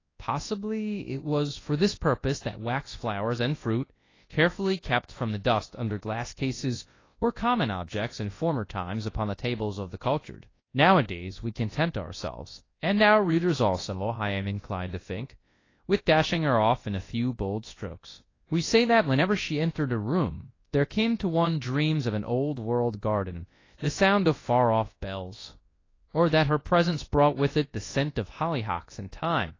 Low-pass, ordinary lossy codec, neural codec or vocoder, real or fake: 7.2 kHz; AAC, 32 kbps; codec, 24 kHz, 0.9 kbps, WavTokenizer, large speech release; fake